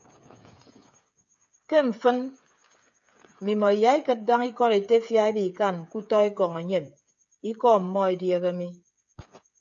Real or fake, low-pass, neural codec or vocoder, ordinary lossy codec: fake; 7.2 kHz; codec, 16 kHz, 8 kbps, FreqCodec, smaller model; AAC, 64 kbps